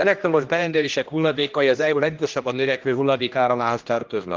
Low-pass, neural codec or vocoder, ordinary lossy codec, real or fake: 7.2 kHz; codec, 16 kHz, 1 kbps, X-Codec, HuBERT features, trained on general audio; Opus, 32 kbps; fake